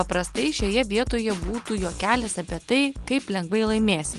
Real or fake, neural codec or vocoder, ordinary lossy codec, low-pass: real; none; Opus, 24 kbps; 10.8 kHz